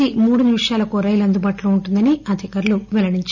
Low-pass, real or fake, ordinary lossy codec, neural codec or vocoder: 7.2 kHz; real; none; none